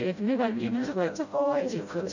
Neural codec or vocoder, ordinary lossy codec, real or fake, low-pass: codec, 16 kHz, 0.5 kbps, FreqCodec, smaller model; none; fake; 7.2 kHz